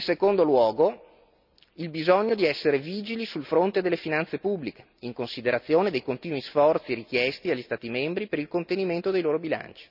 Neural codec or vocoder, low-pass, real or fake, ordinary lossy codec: none; 5.4 kHz; real; none